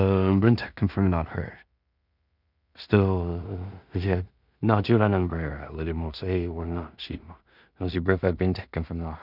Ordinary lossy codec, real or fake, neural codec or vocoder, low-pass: none; fake; codec, 16 kHz in and 24 kHz out, 0.4 kbps, LongCat-Audio-Codec, two codebook decoder; 5.4 kHz